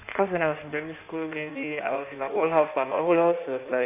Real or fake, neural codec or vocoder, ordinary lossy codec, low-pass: fake; codec, 16 kHz in and 24 kHz out, 1.1 kbps, FireRedTTS-2 codec; none; 3.6 kHz